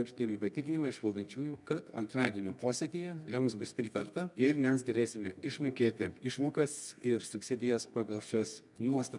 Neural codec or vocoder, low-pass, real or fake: codec, 24 kHz, 0.9 kbps, WavTokenizer, medium music audio release; 10.8 kHz; fake